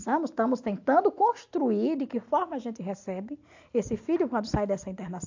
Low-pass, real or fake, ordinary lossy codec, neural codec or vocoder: 7.2 kHz; real; AAC, 48 kbps; none